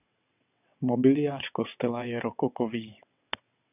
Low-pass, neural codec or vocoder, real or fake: 3.6 kHz; vocoder, 22.05 kHz, 80 mel bands, WaveNeXt; fake